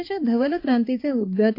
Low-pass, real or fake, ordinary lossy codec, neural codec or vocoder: 5.4 kHz; fake; AAC, 32 kbps; codec, 16 kHz, 2 kbps, X-Codec, HuBERT features, trained on balanced general audio